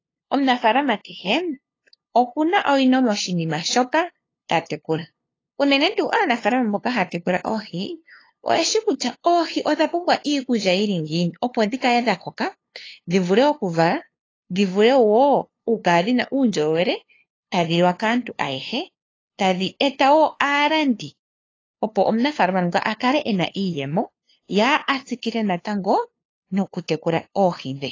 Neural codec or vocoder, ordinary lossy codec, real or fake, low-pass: codec, 16 kHz, 2 kbps, FunCodec, trained on LibriTTS, 25 frames a second; AAC, 32 kbps; fake; 7.2 kHz